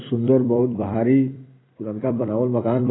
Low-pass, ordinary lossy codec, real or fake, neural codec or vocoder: 7.2 kHz; AAC, 16 kbps; fake; vocoder, 44.1 kHz, 128 mel bands every 256 samples, BigVGAN v2